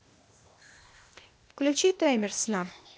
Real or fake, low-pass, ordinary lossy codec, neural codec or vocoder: fake; none; none; codec, 16 kHz, 0.8 kbps, ZipCodec